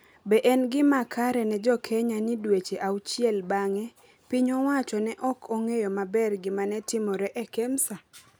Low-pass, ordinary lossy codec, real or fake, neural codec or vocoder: none; none; real; none